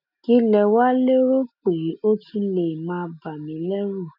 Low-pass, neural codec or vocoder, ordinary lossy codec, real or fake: 5.4 kHz; none; none; real